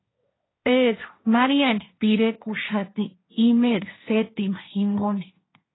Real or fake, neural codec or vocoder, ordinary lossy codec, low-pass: fake; codec, 16 kHz, 1.1 kbps, Voila-Tokenizer; AAC, 16 kbps; 7.2 kHz